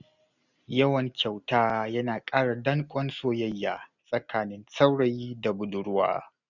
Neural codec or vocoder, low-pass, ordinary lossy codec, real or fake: none; 7.2 kHz; none; real